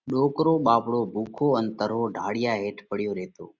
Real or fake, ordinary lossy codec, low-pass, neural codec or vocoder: real; Opus, 64 kbps; 7.2 kHz; none